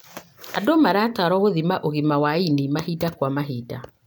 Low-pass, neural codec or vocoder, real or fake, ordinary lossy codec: none; none; real; none